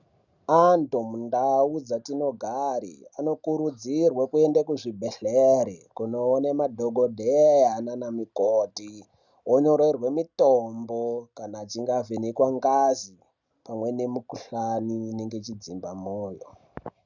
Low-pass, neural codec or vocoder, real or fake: 7.2 kHz; none; real